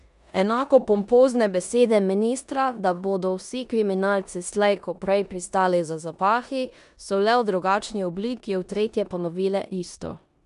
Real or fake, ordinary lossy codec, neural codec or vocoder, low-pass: fake; none; codec, 16 kHz in and 24 kHz out, 0.9 kbps, LongCat-Audio-Codec, four codebook decoder; 10.8 kHz